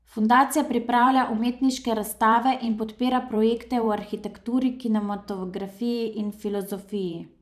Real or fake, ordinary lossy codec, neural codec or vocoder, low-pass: fake; none; vocoder, 44.1 kHz, 128 mel bands every 512 samples, BigVGAN v2; 14.4 kHz